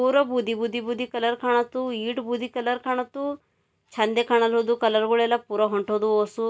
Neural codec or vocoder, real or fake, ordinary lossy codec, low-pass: none; real; none; none